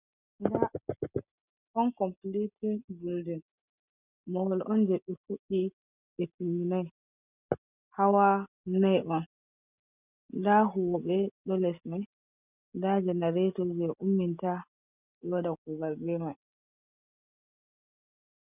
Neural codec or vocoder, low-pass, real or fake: none; 3.6 kHz; real